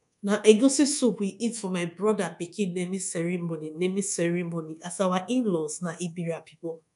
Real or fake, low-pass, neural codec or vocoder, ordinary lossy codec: fake; 10.8 kHz; codec, 24 kHz, 1.2 kbps, DualCodec; none